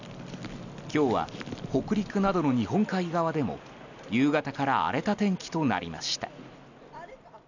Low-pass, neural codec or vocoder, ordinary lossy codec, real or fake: 7.2 kHz; none; none; real